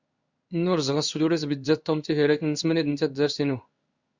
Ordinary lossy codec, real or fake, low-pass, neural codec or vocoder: Opus, 64 kbps; fake; 7.2 kHz; codec, 16 kHz in and 24 kHz out, 1 kbps, XY-Tokenizer